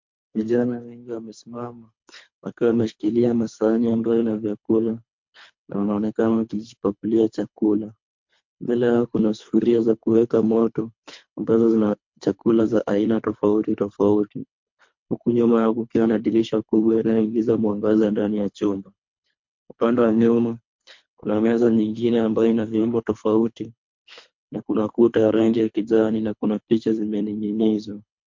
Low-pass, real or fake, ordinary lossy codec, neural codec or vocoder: 7.2 kHz; fake; MP3, 48 kbps; codec, 24 kHz, 3 kbps, HILCodec